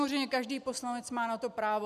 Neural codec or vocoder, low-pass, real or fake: none; 14.4 kHz; real